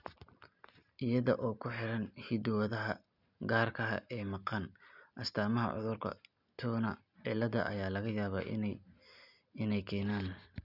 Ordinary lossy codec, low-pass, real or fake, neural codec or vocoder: none; 5.4 kHz; real; none